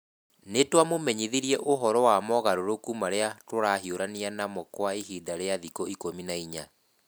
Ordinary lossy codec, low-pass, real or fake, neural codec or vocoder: none; none; real; none